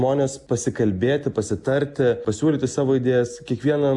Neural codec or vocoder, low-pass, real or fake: none; 10.8 kHz; real